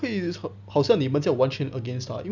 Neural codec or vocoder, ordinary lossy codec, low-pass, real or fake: none; none; 7.2 kHz; real